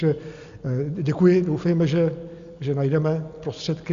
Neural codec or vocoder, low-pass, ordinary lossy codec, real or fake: none; 7.2 kHz; Opus, 64 kbps; real